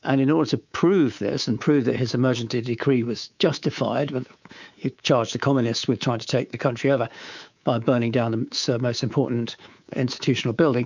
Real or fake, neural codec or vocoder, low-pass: fake; codec, 24 kHz, 3.1 kbps, DualCodec; 7.2 kHz